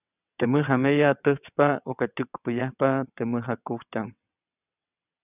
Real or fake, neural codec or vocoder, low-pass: fake; vocoder, 22.05 kHz, 80 mel bands, WaveNeXt; 3.6 kHz